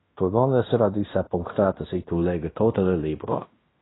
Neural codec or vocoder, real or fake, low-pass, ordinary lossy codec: codec, 16 kHz in and 24 kHz out, 0.9 kbps, LongCat-Audio-Codec, fine tuned four codebook decoder; fake; 7.2 kHz; AAC, 16 kbps